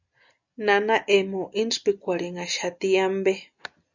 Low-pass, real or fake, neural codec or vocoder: 7.2 kHz; real; none